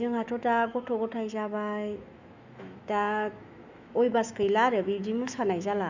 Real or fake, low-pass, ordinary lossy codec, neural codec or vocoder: fake; 7.2 kHz; Opus, 64 kbps; autoencoder, 48 kHz, 128 numbers a frame, DAC-VAE, trained on Japanese speech